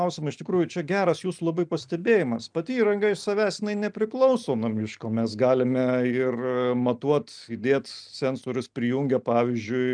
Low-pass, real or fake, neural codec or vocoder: 9.9 kHz; real; none